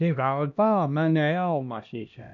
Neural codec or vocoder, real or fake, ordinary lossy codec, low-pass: codec, 16 kHz, 1 kbps, X-Codec, HuBERT features, trained on LibriSpeech; fake; none; 7.2 kHz